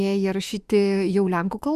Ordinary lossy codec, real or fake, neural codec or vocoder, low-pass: Opus, 64 kbps; fake; autoencoder, 48 kHz, 32 numbers a frame, DAC-VAE, trained on Japanese speech; 14.4 kHz